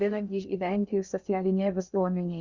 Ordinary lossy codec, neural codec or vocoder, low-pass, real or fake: Opus, 64 kbps; codec, 16 kHz in and 24 kHz out, 0.6 kbps, FocalCodec, streaming, 2048 codes; 7.2 kHz; fake